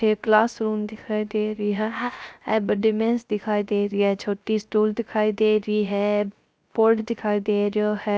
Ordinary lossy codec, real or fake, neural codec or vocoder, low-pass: none; fake; codec, 16 kHz, 0.3 kbps, FocalCodec; none